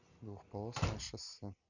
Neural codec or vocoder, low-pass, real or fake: none; 7.2 kHz; real